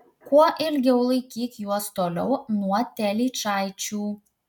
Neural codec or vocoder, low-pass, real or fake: none; 19.8 kHz; real